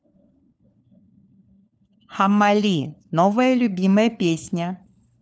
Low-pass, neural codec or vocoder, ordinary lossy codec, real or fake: none; codec, 16 kHz, 4 kbps, FunCodec, trained on LibriTTS, 50 frames a second; none; fake